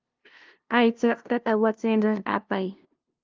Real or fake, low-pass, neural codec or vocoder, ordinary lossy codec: fake; 7.2 kHz; codec, 16 kHz, 0.5 kbps, FunCodec, trained on LibriTTS, 25 frames a second; Opus, 32 kbps